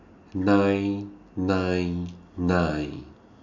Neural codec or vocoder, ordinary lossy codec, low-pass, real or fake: none; none; 7.2 kHz; real